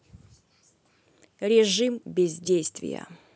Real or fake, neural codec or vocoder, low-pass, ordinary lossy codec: real; none; none; none